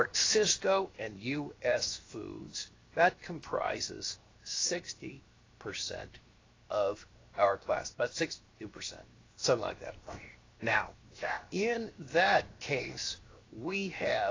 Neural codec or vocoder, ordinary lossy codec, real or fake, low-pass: codec, 16 kHz, 0.7 kbps, FocalCodec; AAC, 32 kbps; fake; 7.2 kHz